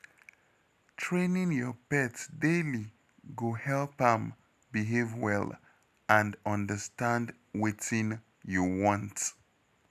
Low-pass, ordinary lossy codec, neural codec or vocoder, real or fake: 14.4 kHz; none; none; real